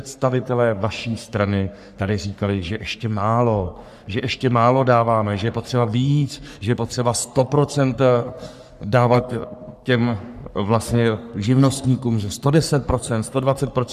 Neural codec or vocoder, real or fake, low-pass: codec, 44.1 kHz, 3.4 kbps, Pupu-Codec; fake; 14.4 kHz